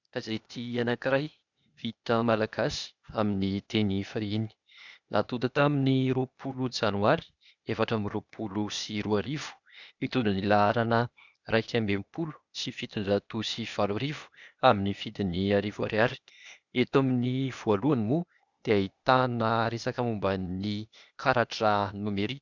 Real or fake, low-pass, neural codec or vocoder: fake; 7.2 kHz; codec, 16 kHz, 0.8 kbps, ZipCodec